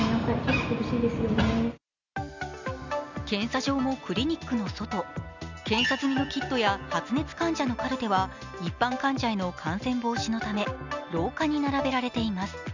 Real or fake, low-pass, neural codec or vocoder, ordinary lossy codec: real; 7.2 kHz; none; none